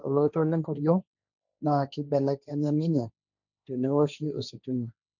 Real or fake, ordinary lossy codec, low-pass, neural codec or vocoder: fake; none; 7.2 kHz; codec, 16 kHz, 1.1 kbps, Voila-Tokenizer